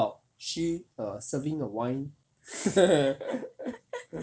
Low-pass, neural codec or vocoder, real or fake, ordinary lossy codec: none; none; real; none